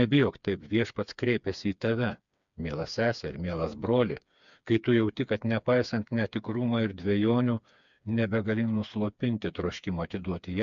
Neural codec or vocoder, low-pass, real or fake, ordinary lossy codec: codec, 16 kHz, 4 kbps, FreqCodec, smaller model; 7.2 kHz; fake; MP3, 64 kbps